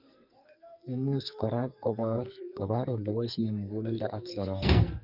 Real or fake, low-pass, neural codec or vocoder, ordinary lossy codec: fake; 5.4 kHz; codec, 44.1 kHz, 2.6 kbps, SNAC; none